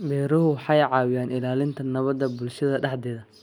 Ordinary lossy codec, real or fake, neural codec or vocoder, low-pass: none; real; none; 19.8 kHz